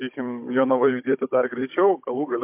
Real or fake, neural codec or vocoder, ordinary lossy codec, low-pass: fake; codec, 16 kHz, 16 kbps, FunCodec, trained on Chinese and English, 50 frames a second; MP3, 32 kbps; 3.6 kHz